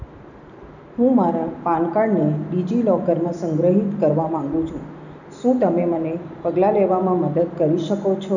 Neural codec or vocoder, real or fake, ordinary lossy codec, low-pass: none; real; none; 7.2 kHz